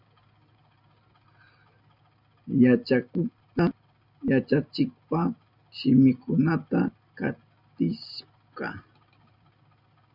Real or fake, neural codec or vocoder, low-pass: real; none; 5.4 kHz